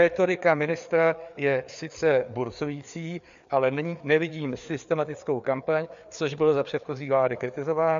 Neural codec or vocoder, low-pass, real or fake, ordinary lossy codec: codec, 16 kHz, 4 kbps, FreqCodec, larger model; 7.2 kHz; fake; MP3, 64 kbps